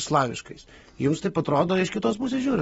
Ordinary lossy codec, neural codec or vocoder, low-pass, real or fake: AAC, 24 kbps; none; 19.8 kHz; real